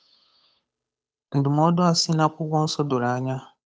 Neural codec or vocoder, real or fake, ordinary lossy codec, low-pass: codec, 16 kHz, 8 kbps, FunCodec, trained on Chinese and English, 25 frames a second; fake; none; none